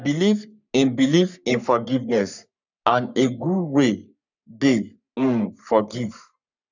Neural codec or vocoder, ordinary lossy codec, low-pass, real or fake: codec, 44.1 kHz, 3.4 kbps, Pupu-Codec; none; 7.2 kHz; fake